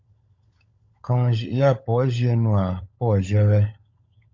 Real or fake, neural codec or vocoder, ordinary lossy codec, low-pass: fake; codec, 16 kHz, 16 kbps, FunCodec, trained on LibriTTS, 50 frames a second; MP3, 64 kbps; 7.2 kHz